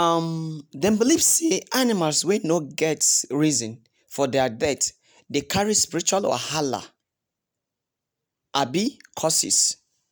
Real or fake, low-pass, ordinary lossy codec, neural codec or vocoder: real; none; none; none